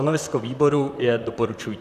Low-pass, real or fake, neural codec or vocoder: 14.4 kHz; fake; vocoder, 44.1 kHz, 128 mel bands, Pupu-Vocoder